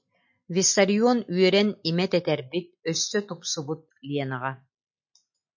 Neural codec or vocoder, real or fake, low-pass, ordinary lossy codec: none; real; 7.2 kHz; MP3, 48 kbps